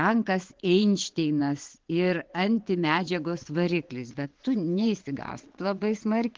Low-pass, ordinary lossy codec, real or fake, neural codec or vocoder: 7.2 kHz; Opus, 16 kbps; fake; vocoder, 22.05 kHz, 80 mel bands, WaveNeXt